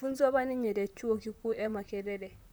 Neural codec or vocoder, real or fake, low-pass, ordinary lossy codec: vocoder, 44.1 kHz, 128 mel bands, Pupu-Vocoder; fake; none; none